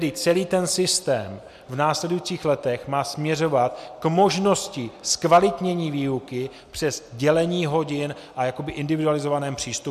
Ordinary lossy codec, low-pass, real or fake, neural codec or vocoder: MP3, 96 kbps; 14.4 kHz; real; none